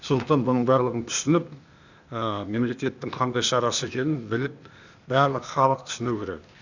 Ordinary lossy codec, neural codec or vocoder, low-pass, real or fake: none; codec, 16 kHz, 0.8 kbps, ZipCodec; 7.2 kHz; fake